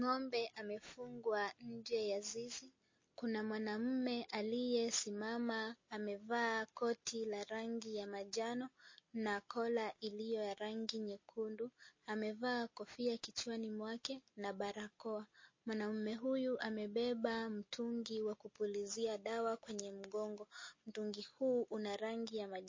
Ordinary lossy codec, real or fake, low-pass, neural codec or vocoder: MP3, 32 kbps; real; 7.2 kHz; none